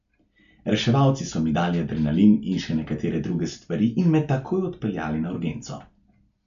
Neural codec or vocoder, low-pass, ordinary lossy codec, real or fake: none; 7.2 kHz; none; real